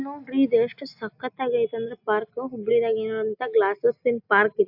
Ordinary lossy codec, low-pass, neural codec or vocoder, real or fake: AAC, 48 kbps; 5.4 kHz; none; real